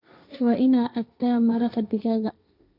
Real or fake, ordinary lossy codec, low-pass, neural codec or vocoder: fake; none; 5.4 kHz; codec, 16 kHz, 1.1 kbps, Voila-Tokenizer